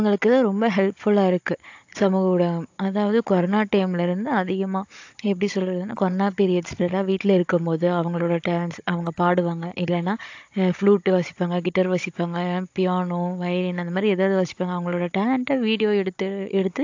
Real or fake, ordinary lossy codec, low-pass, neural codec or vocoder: fake; AAC, 48 kbps; 7.2 kHz; codec, 16 kHz, 16 kbps, FunCodec, trained on Chinese and English, 50 frames a second